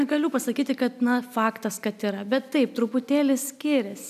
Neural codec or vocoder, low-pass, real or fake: none; 14.4 kHz; real